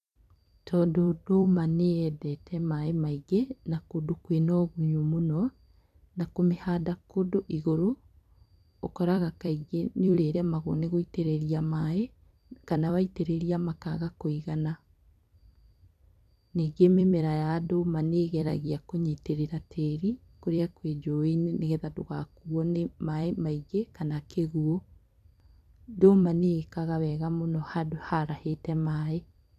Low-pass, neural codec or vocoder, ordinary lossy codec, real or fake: 14.4 kHz; vocoder, 44.1 kHz, 128 mel bands every 256 samples, BigVGAN v2; none; fake